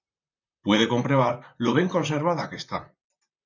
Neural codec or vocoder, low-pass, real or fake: vocoder, 44.1 kHz, 128 mel bands, Pupu-Vocoder; 7.2 kHz; fake